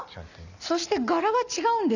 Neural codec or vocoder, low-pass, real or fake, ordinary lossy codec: none; 7.2 kHz; real; none